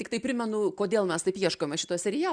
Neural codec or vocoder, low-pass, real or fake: none; 9.9 kHz; real